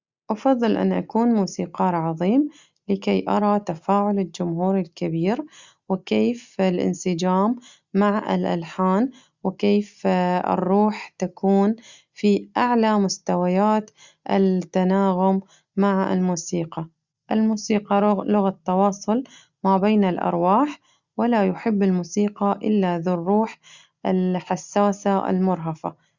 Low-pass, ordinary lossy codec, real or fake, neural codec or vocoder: 7.2 kHz; Opus, 64 kbps; real; none